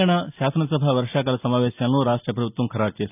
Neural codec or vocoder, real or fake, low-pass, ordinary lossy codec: none; real; 3.6 kHz; none